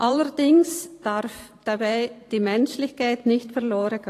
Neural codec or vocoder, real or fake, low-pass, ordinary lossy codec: vocoder, 44.1 kHz, 128 mel bands every 512 samples, BigVGAN v2; fake; 14.4 kHz; AAC, 48 kbps